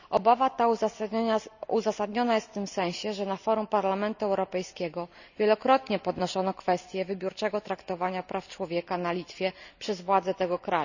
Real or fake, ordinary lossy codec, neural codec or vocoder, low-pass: real; none; none; 7.2 kHz